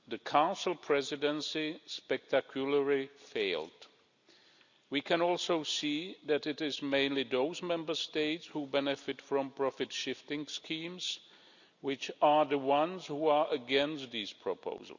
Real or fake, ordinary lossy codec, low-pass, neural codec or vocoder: real; none; 7.2 kHz; none